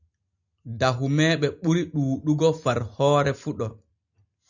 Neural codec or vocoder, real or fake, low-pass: none; real; 7.2 kHz